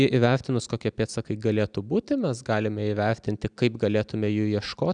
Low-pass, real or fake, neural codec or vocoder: 9.9 kHz; real; none